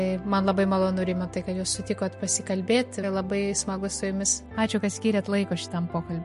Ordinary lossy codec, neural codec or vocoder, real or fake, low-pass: MP3, 48 kbps; none; real; 14.4 kHz